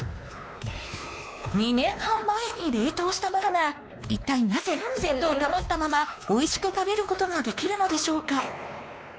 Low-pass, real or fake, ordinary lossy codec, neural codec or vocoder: none; fake; none; codec, 16 kHz, 2 kbps, X-Codec, WavLM features, trained on Multilingual LibriSpeech